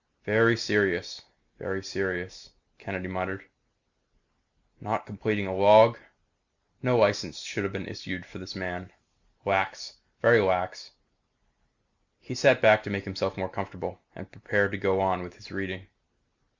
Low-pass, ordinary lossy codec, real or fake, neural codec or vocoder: 7.2 kHz; Opus, 64 kbps; real; none